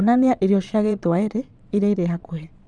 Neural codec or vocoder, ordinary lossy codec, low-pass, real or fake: vocoder, 22.05 kHz, 80 mel bands, Vocos; none; 9.9 kHz; fake